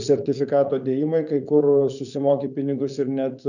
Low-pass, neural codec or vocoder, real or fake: 7.2 kHz; vocoder, 44.1 kHz, 80 mel bands, Vocos; fake